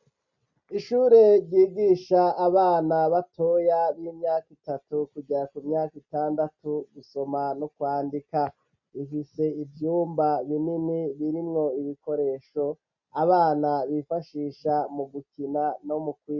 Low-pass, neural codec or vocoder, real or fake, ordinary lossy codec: 7.2 kHz; none; real; MP3, 48 kbps